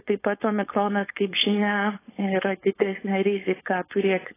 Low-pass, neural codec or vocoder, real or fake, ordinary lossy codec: 3.6 kHz; codec, 16 kHz, 4.8 kbps, FACodec; fake; AAC, 16 kbps